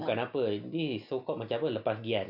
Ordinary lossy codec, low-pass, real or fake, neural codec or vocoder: none; 5.4 kHz; real; none